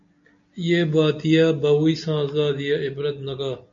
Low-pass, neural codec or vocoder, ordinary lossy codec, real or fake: 7.2 kHz; none; MP3, 48 kbps; real